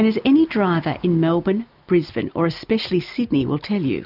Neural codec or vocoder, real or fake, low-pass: none; real; 5.4 kHz